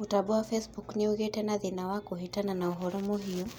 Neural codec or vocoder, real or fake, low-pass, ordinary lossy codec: none; real; none; none